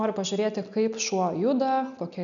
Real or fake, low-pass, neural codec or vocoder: real; 7.2 kHz; none